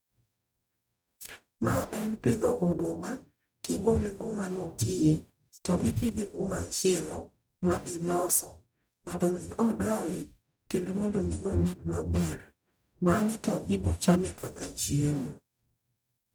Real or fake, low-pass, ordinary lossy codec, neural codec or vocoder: fake; none; none; codec, 44.1 kHz, 0.9 kbps, DAC